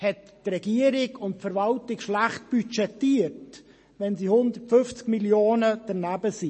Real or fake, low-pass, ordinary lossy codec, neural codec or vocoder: real; 10.8 kHz; MP3, 32 kbps; none